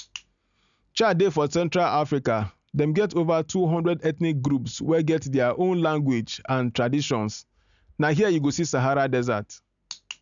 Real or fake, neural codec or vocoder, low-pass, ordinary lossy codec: real; none; 7.2 kHz; none